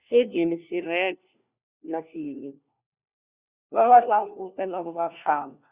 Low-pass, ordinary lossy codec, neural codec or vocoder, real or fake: 3.6 kHz; Opus, 64 kbps; codec, 16 kHz, 1 kbps, FunCodec, trained on LibriTTS, 50 frames a second; fake